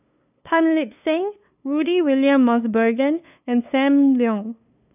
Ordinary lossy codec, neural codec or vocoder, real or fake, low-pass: none; codec, 16 kHz, 2 kbps, FunCodec, trained on LibriTTS, 25 frames a second; fake; 3.6 kHz